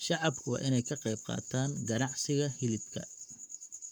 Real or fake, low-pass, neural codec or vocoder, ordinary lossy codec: fake; 19.8 kHz; codec, 44.1 kHz, 7.8 kbps, Pupu-Codec; none